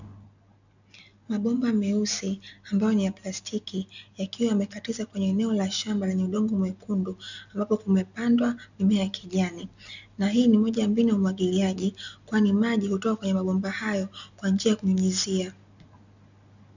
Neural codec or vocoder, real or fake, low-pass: none; real; 7.2 kHz